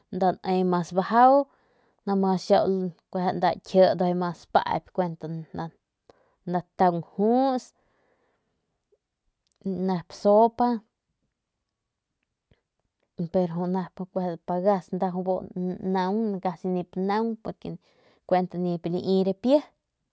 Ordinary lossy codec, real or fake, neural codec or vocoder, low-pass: none; real; none; none